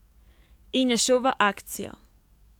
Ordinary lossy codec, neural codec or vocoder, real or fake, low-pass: none; codec, 44.1 kHz, 7.8 kbps, DAC; fake; 19.8 kHz